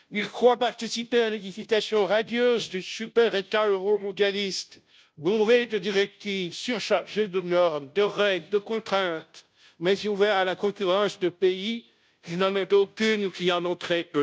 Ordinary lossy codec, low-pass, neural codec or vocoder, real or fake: none; none; codec, 16 kHz, 0.5 kbps, FunCodec, trained on Chinese and English, 25 frames a second; fake